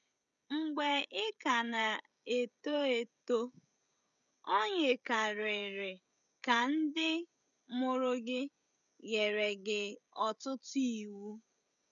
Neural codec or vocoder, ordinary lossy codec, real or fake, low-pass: codec, 16 kHz, 16 kbps, FreqCodec, smaller model; none; fake; 7.2 kHz